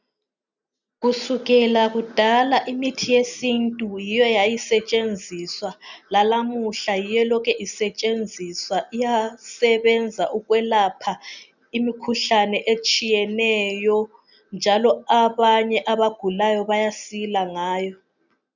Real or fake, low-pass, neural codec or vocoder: real; 7.2 kHz; none